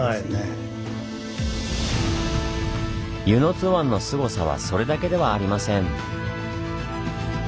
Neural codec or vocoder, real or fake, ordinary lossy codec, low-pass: none; real; none; none